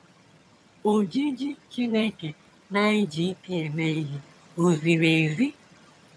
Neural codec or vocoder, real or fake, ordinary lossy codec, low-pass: vocoder, 22.05 kHz, 80 mel bands, HiFi-GAN; fake; none; none